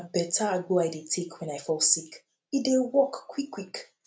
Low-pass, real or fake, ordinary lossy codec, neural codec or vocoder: none; real; none; none